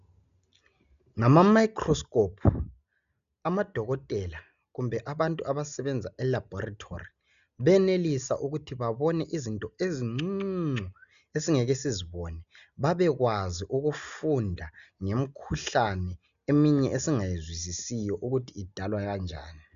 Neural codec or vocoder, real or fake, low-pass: none; real; 7.2 kHz